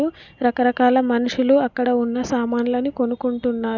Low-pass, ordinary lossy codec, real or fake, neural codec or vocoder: 7.2 kHz; none; real; none